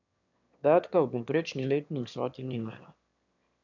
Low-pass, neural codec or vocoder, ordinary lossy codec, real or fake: 7.2 kHz; autoencoder, 22.05 kHz, a latent of 192 numbers a frame, VITS, trained on one speaker; none; fake